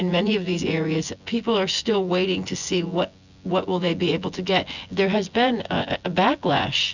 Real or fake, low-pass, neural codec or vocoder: fake; 7.2 kHz; vocoder, 24 kHz, 100 mel bands, Vocos